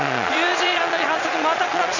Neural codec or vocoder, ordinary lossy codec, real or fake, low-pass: vocoder, 22.05 kHz, 80 mel bands, Vocos; none; fake; 7.2 kHz